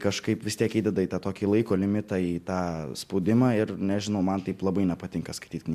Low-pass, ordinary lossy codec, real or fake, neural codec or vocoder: 14.4 kHz; MP3, 96 kbps; real; none